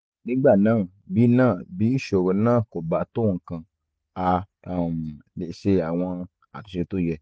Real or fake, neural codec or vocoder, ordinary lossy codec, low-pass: real; none; none; none